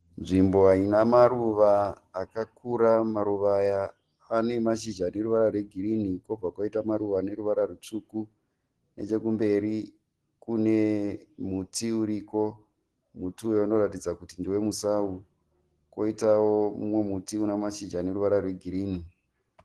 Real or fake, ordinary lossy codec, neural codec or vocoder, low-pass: real; Opus, 16 kbps; none; 10.8 kHz